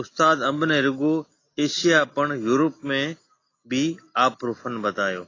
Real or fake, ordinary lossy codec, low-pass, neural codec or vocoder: real; AAC, 32 kbps; 7.2 kHz; none